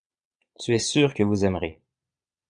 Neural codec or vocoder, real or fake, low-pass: vocoder, 22.05 kHz, 80 mel bands, Vocos; fake; 9.9 kHz